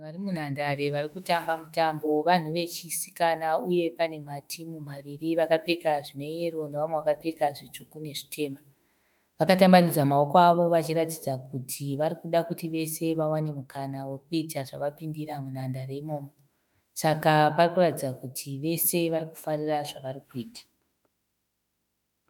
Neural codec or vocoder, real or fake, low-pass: autoencoder, 48 kHz, 32 numbers a frame, DAC-VAE, trained on Japanese speech; fake; 19.8 kHz